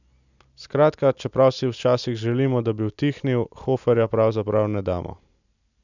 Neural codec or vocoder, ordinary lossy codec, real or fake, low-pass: none; none; real; 7.2 kHz